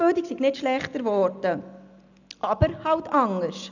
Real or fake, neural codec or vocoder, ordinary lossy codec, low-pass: real; none; none; 7.2 kHz